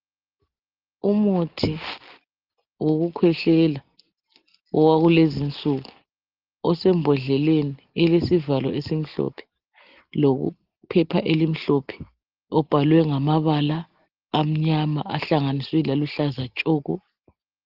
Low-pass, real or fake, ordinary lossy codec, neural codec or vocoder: 5.4 kHz; real; Opus, 16 kbps; none